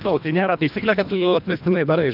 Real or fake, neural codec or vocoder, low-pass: fake; codec, 24 kHz, 1.5 kbps, HILCodec; 5.4 kHz